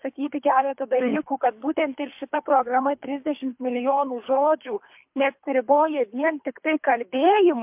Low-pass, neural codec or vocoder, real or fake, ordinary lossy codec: 3.6 kHz; codec, 24 kHz, 3 kbps, HILCodec; fake; MP3, 32 kbps